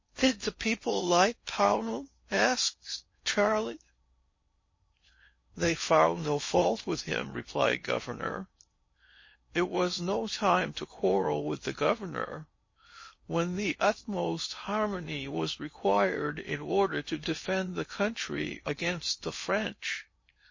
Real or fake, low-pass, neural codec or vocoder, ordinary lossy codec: fake; 7.2 kHz; codec, 16 kHz in and 24 kHz out, 0.6 kbps, FocalCodec, streaming, 4096 codes; MP3, 32 kbps